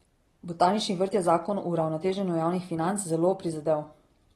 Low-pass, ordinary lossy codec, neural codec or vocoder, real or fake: 19.8 kHz; AAC, 32 kbps; none; real